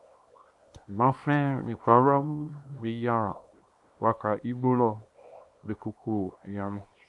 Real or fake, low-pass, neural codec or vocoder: fake; 10.8 kHz; codec, 24 kHz, 0.9 kbps, WavTokenizer, small release